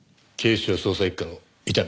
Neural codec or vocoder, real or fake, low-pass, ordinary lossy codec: none; real; none; none